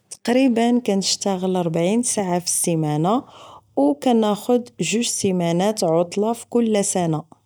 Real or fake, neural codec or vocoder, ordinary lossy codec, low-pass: real; none; none; none